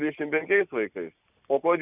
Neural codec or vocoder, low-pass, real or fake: none; 3.6 kHz; real